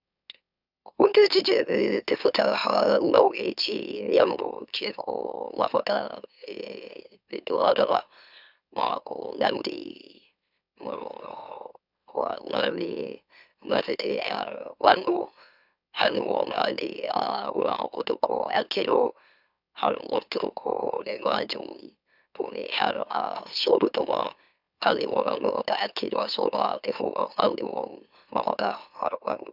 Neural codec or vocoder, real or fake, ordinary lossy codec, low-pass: autoencoder, 44.1 kHz, a latent of 192 numbers a frame, MeloTTS; fake; none; 5.4 kHz